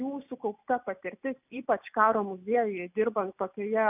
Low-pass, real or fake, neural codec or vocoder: 3.6 kHz; real; none